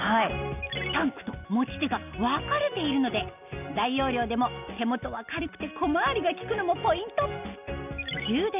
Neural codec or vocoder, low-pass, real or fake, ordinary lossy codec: none; 3.6 kHz; real; none